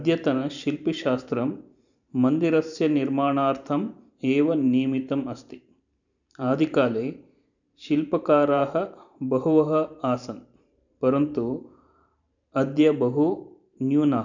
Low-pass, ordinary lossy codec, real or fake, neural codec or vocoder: 7.2 kHz; none; fake; vocoder, 44.1 kHz, 128 mel bands every 512 samples, BigVGAN v2